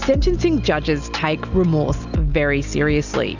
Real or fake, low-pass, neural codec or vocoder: real; 7.2 kHz; none